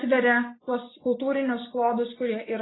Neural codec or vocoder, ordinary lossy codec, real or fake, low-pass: none; AAC, 16 kbps; real; 7.2 kHz